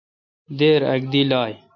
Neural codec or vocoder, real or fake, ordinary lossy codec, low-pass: none; real; MP3, 64 kbps; 7.2 kHz